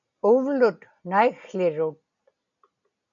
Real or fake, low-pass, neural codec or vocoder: real; 7.2 kHz; none